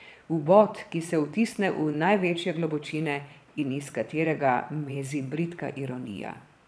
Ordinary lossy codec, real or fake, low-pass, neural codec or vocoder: none; fake; none; vocoder, 22.05 kHz, 80 mel bands, Vocos